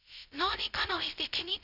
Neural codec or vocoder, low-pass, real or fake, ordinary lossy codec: codec, 16 kHz, 0.2 kbps, FocalCodec; 5.4 kHz; fake; none